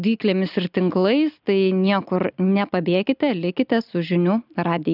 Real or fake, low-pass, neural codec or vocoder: real; 5.4 kHz; none